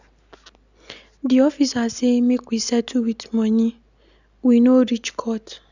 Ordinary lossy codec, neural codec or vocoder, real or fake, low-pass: none; none; real; 7.2 kHz